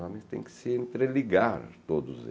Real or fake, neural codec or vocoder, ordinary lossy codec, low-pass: real; none; none; none